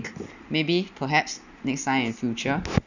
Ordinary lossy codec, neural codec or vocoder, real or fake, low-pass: none; none; real; 7.2 kHz